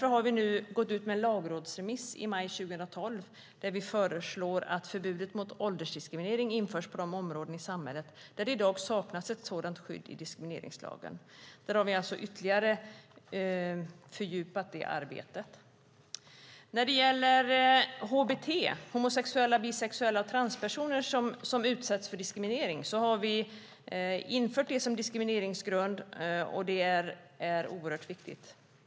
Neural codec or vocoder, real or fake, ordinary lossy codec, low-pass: none; real; none; none